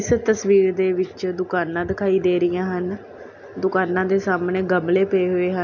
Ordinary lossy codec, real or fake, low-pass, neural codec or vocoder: none; real; 7.2 kHz; none